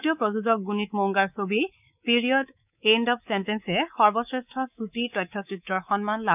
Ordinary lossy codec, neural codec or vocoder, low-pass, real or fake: none; codec, 24 kHz, 3.1 kbps, DualCodec; 3.6 kHz; fake